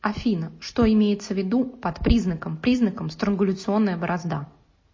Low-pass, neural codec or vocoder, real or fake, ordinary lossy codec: 7.2 kHz; none; real; MP3, 32 kbps